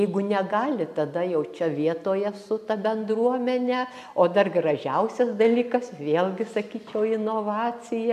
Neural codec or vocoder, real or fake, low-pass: none; real; 14.4 kHz